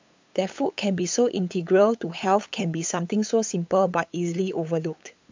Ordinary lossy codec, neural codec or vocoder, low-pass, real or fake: MP3, 64 kbps; codec, 16 kHz, 8 kbps, FunCodec, trained on LibriTTS, 25 frames a second; 7.2 kHz; fake